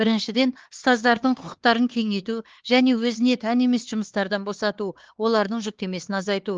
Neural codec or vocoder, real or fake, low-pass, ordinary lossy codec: codec, 16 kHz, 4 kbps, X-Codec, HuBERT features, trained on LibriSpeech; fake; 7.2 kHz; Opus, 16 kbps